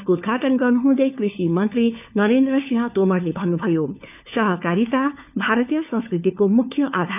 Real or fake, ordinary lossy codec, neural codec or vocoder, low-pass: fake; none; codec, 16 kHz, 4 kbps, FunCodec, trained on LibriTTS, 50 frames a second; 3.6 kHz